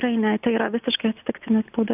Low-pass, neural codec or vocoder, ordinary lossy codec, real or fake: 3.6 kHz; none; AAC, 32 kbps; real